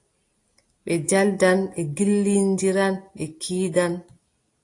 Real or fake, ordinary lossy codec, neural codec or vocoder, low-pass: real; AAC, 48 kbps; none; 10.8 kHz